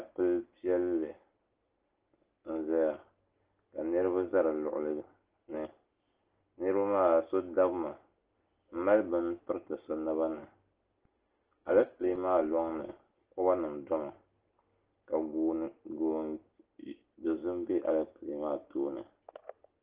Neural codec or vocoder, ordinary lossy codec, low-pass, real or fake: none; Opus, 16 kbps; 3.6 kHz; real